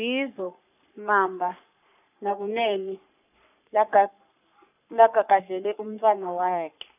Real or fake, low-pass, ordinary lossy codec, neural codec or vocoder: fake; 3.6 kHz; none; codec, 44.1 kHz, 3.4 kbps, Pupu-Codec